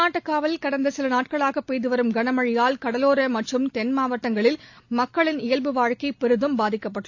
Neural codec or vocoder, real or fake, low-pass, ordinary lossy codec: none; real; 7.2 kHz; AAC, 48 kbps